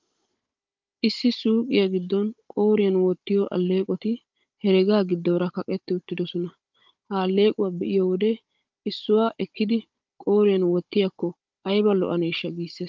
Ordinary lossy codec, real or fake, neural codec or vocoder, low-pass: Opus, 24 kbps; fake; codec, 16 kHz, 16 kbps, FunCodec, trained on Chinese and English, 50 frames a second; 7.2 kHz